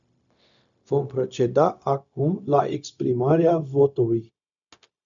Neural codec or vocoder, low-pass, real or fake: codec, 16 kHz, 0.4 kbps, LongCat-Audio-Codec; 7.2 kHz; fake